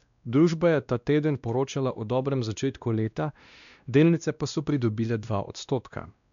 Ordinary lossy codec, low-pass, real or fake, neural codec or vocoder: none; 7.2 kHz; fake; codec, 16 kHz, 1 kbps, X-Codec, WavLM features, trained on Multilingual LibriSpeech